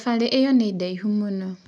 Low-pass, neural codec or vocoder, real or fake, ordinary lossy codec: none; none; real; none